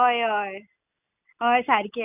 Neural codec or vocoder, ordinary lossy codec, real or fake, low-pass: none; none; real; 3.6 kHz